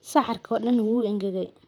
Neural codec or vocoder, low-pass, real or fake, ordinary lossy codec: vocoder, 44.1 kHz, 128 mel bands, Pupu-Vocoder; 19.8 kHz; fake; none